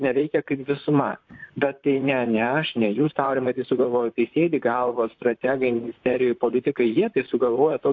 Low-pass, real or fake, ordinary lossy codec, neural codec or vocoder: 7.2 kHz; fake; AAC, 48 kbps; vocoder, 44.1 kHz, 128 mel bands, Pupu-Vocoder